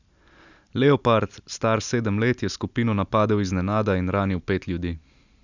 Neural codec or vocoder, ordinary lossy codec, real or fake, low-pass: none; none; real; 7.2 kHz